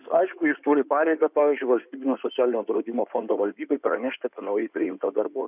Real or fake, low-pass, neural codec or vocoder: fake; 3.6 kHz; codec, 16 kHz in and 24 kHz out, 2.2 kbps, FireRedTTS-2 codec